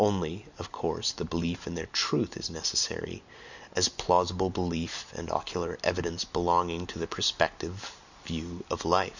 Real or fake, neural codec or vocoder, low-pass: real; none; 7.2 kHz